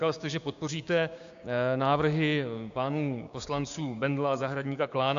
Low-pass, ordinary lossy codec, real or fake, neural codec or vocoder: 7.2 kHz; AAC, 64 kbps; fake; codec, 16 kHz, 6 kbps, DAC